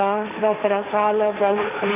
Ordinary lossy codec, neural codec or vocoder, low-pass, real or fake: none; codec, 16 kHz, 1.1 kbps, Voila-Tokenizer; 3.6 kHz; fake